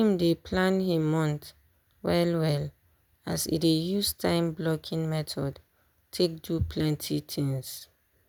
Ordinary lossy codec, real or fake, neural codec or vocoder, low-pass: none; real; none; none